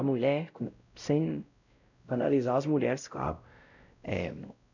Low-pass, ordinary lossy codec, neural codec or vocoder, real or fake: 7.2 kHz; none; codec, 16 kHz, 0.5 kbps, X-Codec, WavLM features, trained on Multilingual LibriSpeech; fake